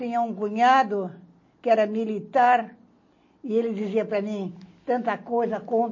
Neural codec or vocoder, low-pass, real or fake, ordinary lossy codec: none; 7.2 kHz; real; MP3, 32 kbps